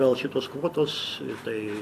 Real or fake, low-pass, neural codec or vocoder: fake; 14.4 kHz; vocoder, 44.1 kHz, 128 mel bands every 256 samples, BigVGAN v2